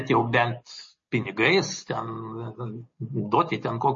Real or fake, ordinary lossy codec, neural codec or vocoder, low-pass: real; MP3, 32 kbps; none; 7.2 kHz